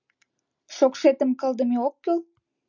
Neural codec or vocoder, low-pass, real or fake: none; 7.2 kHz; real